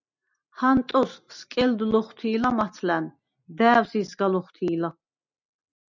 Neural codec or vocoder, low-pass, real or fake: none; 7.2 kHz; real